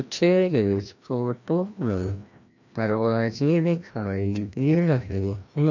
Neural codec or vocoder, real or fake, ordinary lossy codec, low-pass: codec, 16 kHz, 1 kbps, FreqCodec, larger model; fake; none; 7.2 kHz